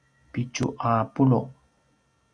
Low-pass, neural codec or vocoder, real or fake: 9.9 kHz; none; real